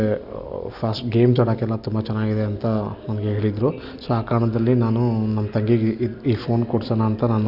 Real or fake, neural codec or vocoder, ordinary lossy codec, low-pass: real; none; none; 5.4 kHz